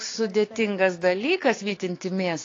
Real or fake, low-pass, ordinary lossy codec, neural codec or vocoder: fake; 7.2 kHz; AAC, 32 kbps; codec, 16 kHz, 6 kbps, DAC